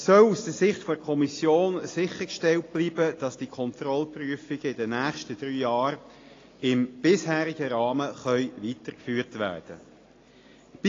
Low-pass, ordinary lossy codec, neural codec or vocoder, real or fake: 7.2 kHz; AAC, 32 kbps; none; real